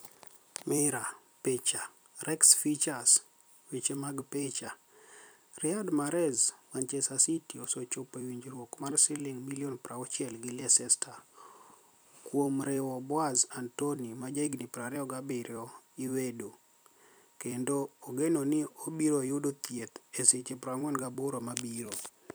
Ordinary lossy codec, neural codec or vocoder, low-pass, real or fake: none; vocoder, 44.1 kHz, 128 mel bands every 256 samples, BigVGAN v2; none; fake